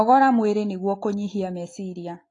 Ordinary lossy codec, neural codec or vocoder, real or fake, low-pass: AAC, 32 kbps; none; real; 10.8 kHz